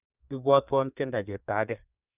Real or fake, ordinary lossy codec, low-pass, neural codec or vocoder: fake; none; 3.6 kHz; codec, 32 kHz, 1.9 kbps, SNAC